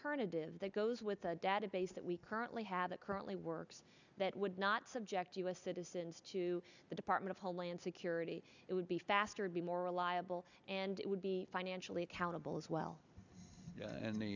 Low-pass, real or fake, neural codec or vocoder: 7.2 kHz; real; none